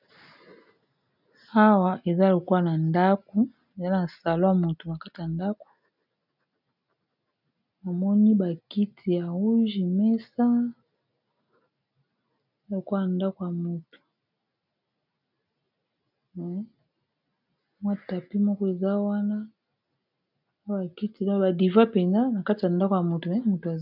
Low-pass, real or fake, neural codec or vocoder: 5.4 kHz; real; none